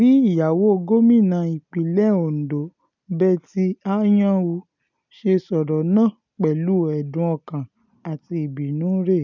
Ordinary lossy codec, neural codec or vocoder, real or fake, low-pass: none; none; real; 7.2 kHz